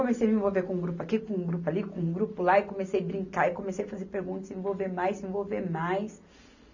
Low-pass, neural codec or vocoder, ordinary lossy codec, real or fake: 7.2 kHz; none; none; real